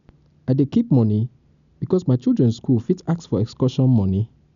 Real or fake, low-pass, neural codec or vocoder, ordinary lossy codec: real; 7.2 kHz; none; none